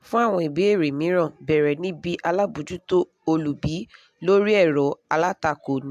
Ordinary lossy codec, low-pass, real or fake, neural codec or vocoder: AAC, 96 kbps; 14.4 kHz; real; none